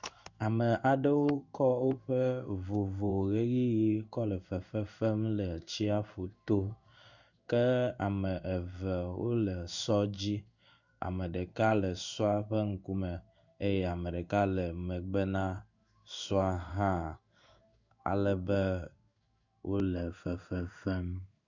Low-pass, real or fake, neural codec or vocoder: 7.2 kHz; fake; codec, 16 kHz in and 24 kHz out, 1 kbps, XY-Tokenizer